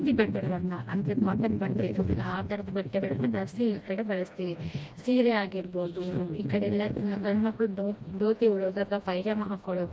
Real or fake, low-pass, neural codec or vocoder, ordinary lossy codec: fake; none; codec, 16 kHz, 1 kbps, FreqCodec, smaller model; none